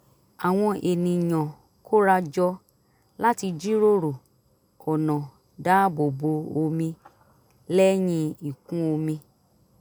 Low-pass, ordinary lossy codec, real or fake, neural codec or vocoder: none; none; real; none